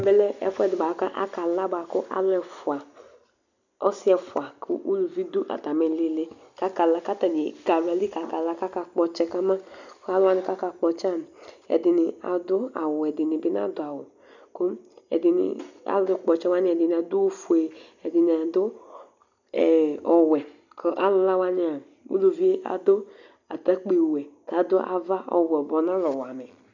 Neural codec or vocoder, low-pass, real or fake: none; 7.2 kHz; real